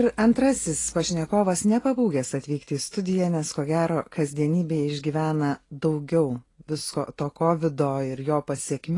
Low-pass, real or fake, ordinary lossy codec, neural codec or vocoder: 10.8 kHz; real; AAC, 32 kbps; none